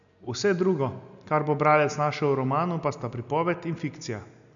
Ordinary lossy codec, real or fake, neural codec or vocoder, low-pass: none; real; none; 7.2 kHz